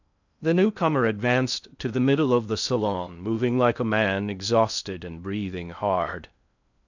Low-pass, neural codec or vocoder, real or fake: 7.2 kHz; codec, 16 kHz in and 24 kHz out, 0.6 kbps, FocalCodec, streaming, 2048 codes; fake